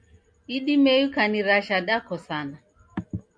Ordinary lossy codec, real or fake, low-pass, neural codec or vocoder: MP3, 64 kbps; real; 9.9 kHz; none